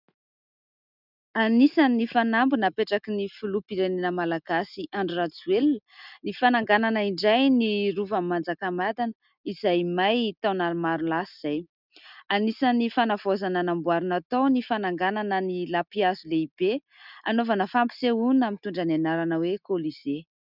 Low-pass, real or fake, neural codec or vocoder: 5.4 kHz; real; none